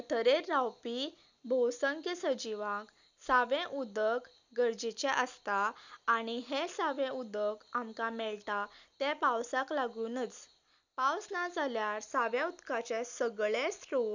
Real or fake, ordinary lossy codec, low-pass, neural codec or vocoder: real; none; 7.2 kHz; none